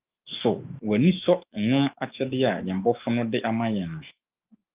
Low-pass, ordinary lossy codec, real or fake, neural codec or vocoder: 3.6 kHz; Opus, 24 kbps; fake; autoencoder, 48 kHz, 128 numbers a frame, DAC-VAE, trained on Japanese speech